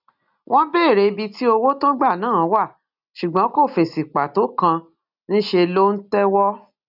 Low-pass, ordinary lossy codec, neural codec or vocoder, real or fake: 5.4 kHz; none; none; real